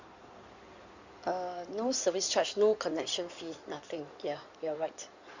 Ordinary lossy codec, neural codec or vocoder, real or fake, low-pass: Opus, 64 kbps; codec, 16 kHz in and 24 kHz out, 2.2 kbps, FireRedTTS-2 codec; fake; 7.2 kHz